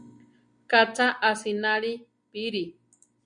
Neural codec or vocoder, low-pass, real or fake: none; 9.9 kHz; real